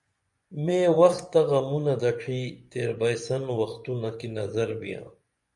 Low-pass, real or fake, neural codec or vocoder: 10.8 kHz; fake; vocoder, 24 kHz, 100 mel bands, Vocos